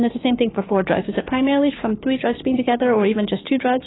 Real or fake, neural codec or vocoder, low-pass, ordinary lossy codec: fake; codec, 16 kHz, 6 kbps, DAC; 7.2 kHz; AAC, 16 kbps